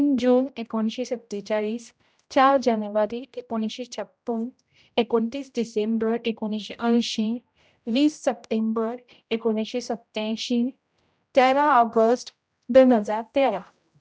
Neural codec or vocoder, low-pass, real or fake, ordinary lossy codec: codec, 16 kHz, 0.5 kbps, X-Codec, HuBERT features, trained on general audio; none; fake; none